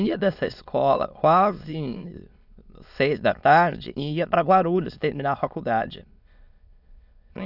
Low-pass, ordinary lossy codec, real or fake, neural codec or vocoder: 5.4 kHz; none; fake; autoencoder, 22.05 kHz, a latent of 192 numbers a frame, VITS, trained on many speakers